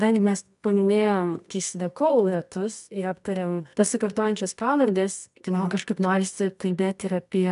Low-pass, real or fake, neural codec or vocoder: 10.8 kHz; fake; codec, 24 kHz, 0.9 kbps, WavTokenizer, medium music audio release